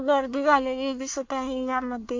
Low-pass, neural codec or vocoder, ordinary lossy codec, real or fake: 7.2 kHz; codec, 24 kHz, 1 kbps, SNAC; MP3, 48 kbps; fake